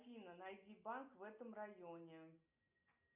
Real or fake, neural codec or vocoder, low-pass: real; none; 3.6 kHz